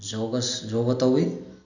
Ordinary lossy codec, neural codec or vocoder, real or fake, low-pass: none; none; real; 7.2 kHz